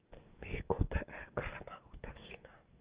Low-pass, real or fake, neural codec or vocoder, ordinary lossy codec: 3.6 kHz; fake; codec, 44.1 kHz, 7.8 kbps, DAC; Opus, 24 kbps